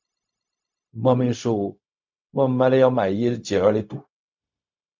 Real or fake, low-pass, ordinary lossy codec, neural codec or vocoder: fake; 7.2 kHz; MP3, 64 kbps; codec, 16 kHz, 0.4 kbps, LongCat-Audio-Codec